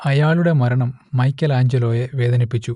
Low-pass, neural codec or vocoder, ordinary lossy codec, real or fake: 10.8 kHz; none; none; real